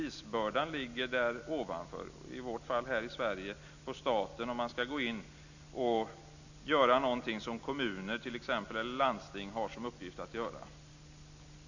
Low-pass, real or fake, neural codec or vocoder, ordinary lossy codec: 7.2 kHz; real; none; none